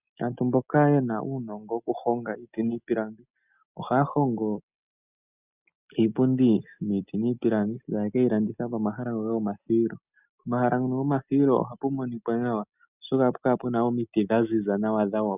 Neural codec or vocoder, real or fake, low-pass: none; real; 3.6 kHz